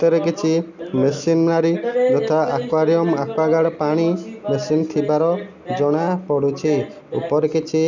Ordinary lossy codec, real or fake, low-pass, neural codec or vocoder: none; real; 7.2 kHz; none